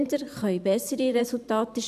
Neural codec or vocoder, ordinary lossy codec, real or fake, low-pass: vocoder, 44.1 kHz, 128 mel bands every 512 samples, BigVGAN v2; none; fake; 14.4 kHz